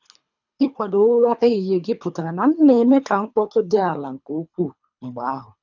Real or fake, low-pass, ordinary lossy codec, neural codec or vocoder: fake; 7.2 kHz; none; codec, 24 kHz, 3 kbps, HILCodec